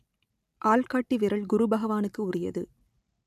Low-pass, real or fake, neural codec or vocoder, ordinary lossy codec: 14.4 kHz; real; none; none